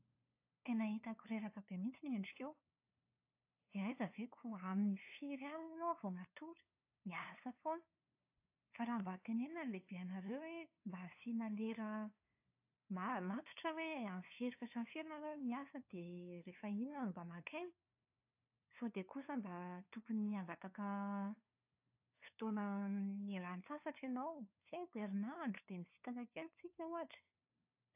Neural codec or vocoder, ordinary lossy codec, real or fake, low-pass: codec, 16 kHz, 2 kbps, FunCodec, trained on LibriTTS, 25 frames a second; AAC, 32 kbps; fake; 3.6 kHz